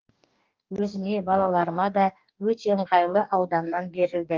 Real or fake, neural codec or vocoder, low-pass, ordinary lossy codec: fake; codec, 44.1 kHz, 2.6 kbps, DAC; 7.2 kHz; Opus, 24 kbps